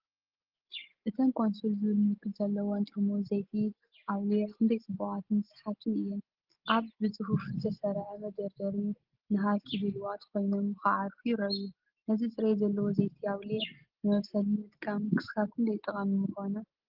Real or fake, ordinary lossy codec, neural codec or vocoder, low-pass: real; Opus, 16 kbps; none; 5.4 kHz